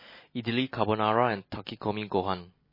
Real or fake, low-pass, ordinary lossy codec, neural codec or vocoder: real; 5.4 kHz; MP3, 24 kbps; none